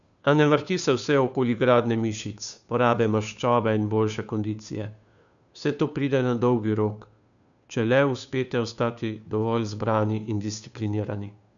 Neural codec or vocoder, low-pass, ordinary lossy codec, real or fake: codec, 16 kHz, 2 kbps, FunCodec, trained on Chinese and English, 25 frames a second; 7.2 kHz; none; fake